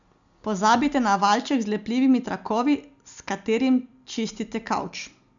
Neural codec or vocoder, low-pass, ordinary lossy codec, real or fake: none; 7.2 kHz; none; real